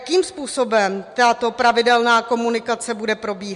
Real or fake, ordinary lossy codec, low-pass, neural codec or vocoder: real; MP3, 64 kbps; 10.8 kHz; none